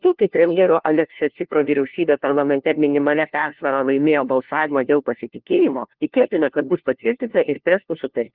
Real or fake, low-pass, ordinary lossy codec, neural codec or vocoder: fake; 5.4 kHz; Opus, 16 kbps; codec, 16 kHz, 1 kbps, FunCodec, trained on LibriTTS, 50 frames a second